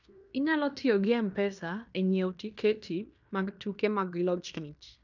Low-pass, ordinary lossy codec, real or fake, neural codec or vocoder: 7.2 kHz; none; fake; codec, 16 kHz in and 24 kHz out, 0.9 kbps, LongCat-Audio-Codec, fine tuned four codebook decoder